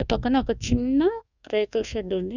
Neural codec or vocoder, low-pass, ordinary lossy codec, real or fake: codec, 24 kHz, 1.2 kbps, DualCodec; 7.2 kHz; none; fake